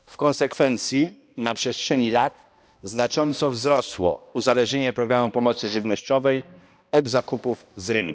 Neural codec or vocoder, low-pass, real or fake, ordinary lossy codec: codec, 16 kHz, 1 kbps, X-Codec, HuBERT features, trained on balanced general audio; none; fake; none